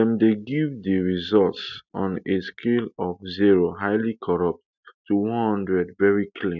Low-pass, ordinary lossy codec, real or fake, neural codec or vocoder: 7.2 kHz; none; real; none